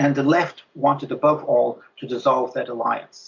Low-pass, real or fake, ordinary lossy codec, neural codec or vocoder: 7.2 kHz; real; MP3, 64 kbps; none